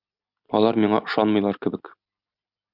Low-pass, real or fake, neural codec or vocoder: 5.4 kHz; real; none